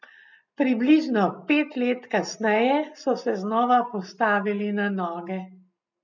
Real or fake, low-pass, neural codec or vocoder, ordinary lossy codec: real; 7.2 kHz; none; none